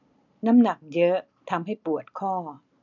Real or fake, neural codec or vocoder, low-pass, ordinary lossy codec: real; none; 7.2 kHz; none